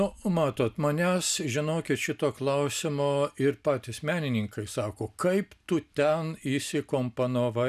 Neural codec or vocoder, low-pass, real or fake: none; 14.4 kHz; real